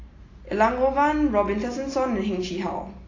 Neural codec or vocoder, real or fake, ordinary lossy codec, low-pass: none; real; AAC, 32 kbps; 7.2 kHz